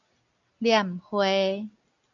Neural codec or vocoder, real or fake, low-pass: none; real; 7.2 kHz